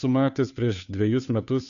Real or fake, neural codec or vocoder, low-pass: fake; codec, 16 kHz, 2 kbps, FunCodec, trained on Chinese and English, 25 frames a second; 7.2 kHz